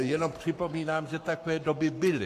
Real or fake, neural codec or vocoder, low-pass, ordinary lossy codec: fake; codec, 44.1 kHz, 7.8 kbps, Pupu-Codec; 14.4 kHz; AAC, 64 kbps